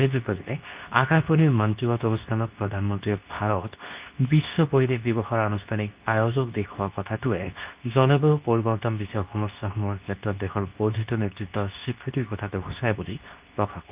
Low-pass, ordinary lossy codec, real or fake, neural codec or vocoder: 3.6 kHz; Opus, 24 kbps; fake; codec, 24 kHz, 0.9 kbps, WavTokenizer, medium speech release version 2